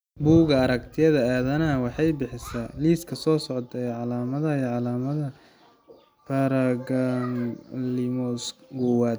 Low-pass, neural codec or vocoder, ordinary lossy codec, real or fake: none; none; none; real